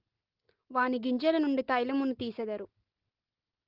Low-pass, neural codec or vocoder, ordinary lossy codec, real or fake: 5.4 kHz; none; Opus, 16 kbps; real